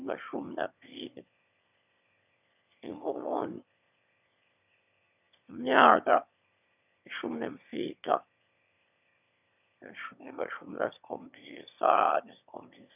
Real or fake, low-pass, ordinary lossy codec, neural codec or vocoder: fake; 3.6 kHz; none; autoencoder, 22.05 kHz, a latent of 192 numbers a frame, VITS, trained on one speaker